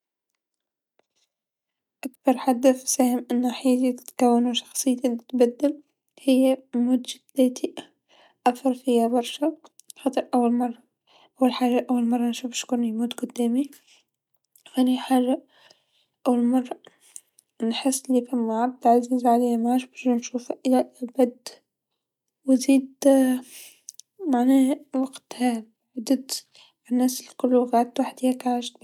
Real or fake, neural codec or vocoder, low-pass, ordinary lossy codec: real; none; 19.8 kHz; none